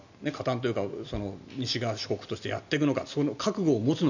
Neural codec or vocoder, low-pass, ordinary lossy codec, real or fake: none; 7.2 kHz; none; real